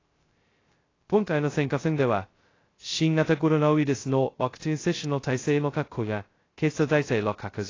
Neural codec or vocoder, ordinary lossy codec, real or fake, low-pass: codec, 16 kHz, 0.2 kbps, FocalCodec; AAC, 32 kbps; fake; 7.2 kHz